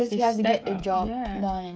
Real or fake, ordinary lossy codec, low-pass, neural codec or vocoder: fake; none; none; codec, 16 kHz, 16 kbps, FreqCodec, smaller model